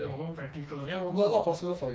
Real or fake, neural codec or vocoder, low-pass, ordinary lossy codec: fake; codec, 16 kHz, 2 kbps, FreqCodec, smaller model; none; none